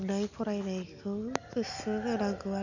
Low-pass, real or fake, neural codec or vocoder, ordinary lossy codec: 7.2 kHz; real; none; none